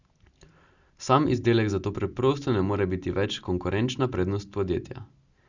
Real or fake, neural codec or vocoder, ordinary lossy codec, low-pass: real; none; Opus, 64 kbps; 7.2 kHz